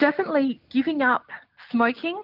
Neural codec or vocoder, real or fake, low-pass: none; real; 5.4 kHz